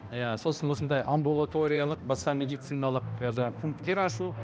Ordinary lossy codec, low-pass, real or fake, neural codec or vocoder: none; none; fake; codec, 16 kHz, 1 kbps, X-Codec, HuBERT features, trained on balanced general audio